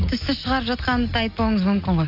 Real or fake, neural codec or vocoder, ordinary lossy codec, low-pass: real; none; none; 5.4 kHz